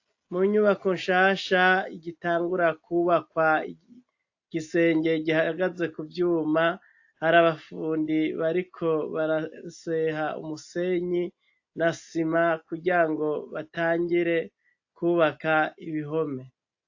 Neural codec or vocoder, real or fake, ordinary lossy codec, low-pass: none; real; AAC, 48 kbps; 7.2 kHz